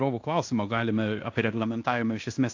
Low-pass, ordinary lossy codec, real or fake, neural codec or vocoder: 7.2 kHz; AAC, 48 kbps; fake; codec, 16 kHz in and 24 kHz out, 0.9 kbps, LongCat-Audio-Codec, fine tuned four codebook decoder